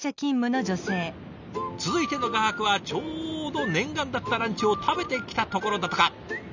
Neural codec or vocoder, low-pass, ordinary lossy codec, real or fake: none; 7.2 kHz; none; real